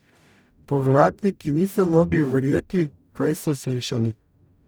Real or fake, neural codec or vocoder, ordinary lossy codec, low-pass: fake; codec, 44.1 kHz, 0.9 kbps, DAC; none; none